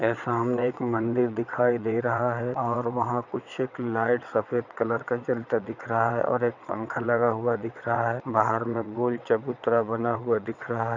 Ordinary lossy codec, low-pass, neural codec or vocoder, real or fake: none; 7.2 kHz; vocoder, 22.05 kHz, 80 mel bands, WaveNeXt; fake